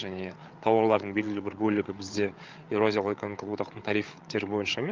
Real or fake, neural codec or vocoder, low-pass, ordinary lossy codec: fake; codec, 16 kHz, 8 kbps, FreqCodec, larger model; 7.2 kHz; Opus, 24 kbps